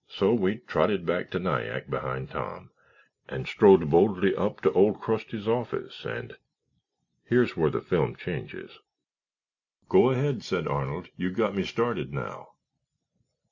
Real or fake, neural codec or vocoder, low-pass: real; none; 7.2 kHz